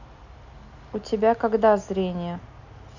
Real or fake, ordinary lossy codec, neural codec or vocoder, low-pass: real; none; none; 7.2 kHz